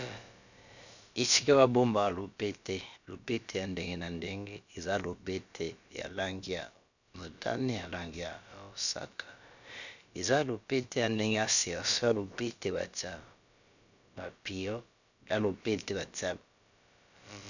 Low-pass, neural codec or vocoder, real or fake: 7.2 kHz; codec, 16 kHz, about 1 kbps, DyCAST, with the encoder's durations; fake